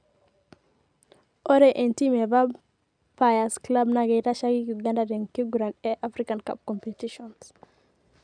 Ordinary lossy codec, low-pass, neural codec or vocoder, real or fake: none; 9.9 kHz; none; real